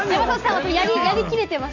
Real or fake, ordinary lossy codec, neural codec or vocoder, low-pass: real; none; none; 7.2 kHz